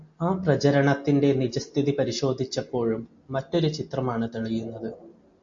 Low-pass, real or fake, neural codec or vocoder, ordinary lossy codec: 7.2 kHz; real; none; MP3, 48 kbps